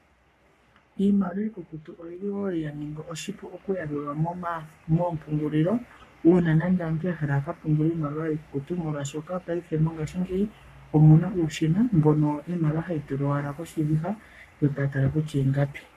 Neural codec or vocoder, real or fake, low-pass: codec, 44.1 kHz, 3.4 kbps, Pupu-Codec; fake; 14.4 kHz